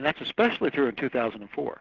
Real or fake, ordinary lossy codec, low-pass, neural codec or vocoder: real; Opus, 16 kbps; 7.2 kHz; none